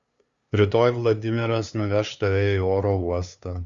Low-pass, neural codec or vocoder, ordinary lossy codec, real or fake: 7.2 kHz; codec, 16 kHz, 2 kbps, FunCodec, trained on LibriTTS, 25 frames a second; Opus, 64 kbps; fake